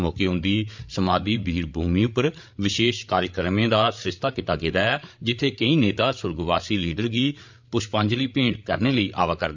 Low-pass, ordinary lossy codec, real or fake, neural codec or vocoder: 7.2 kHz; MP3, 64 kbps; fake; codec, 16 kHz, 8 kbps, FreqCodec, larger model